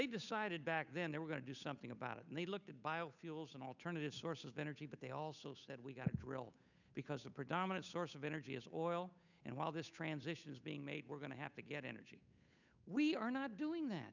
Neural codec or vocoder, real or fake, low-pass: codec, 16 kHz, 8 kbps, FunCodec, trained on Chinese and English, 25 frames a second; fake; 7.2 kHz